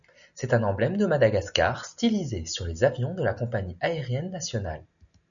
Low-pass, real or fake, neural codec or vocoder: 7.2 kHz; real; none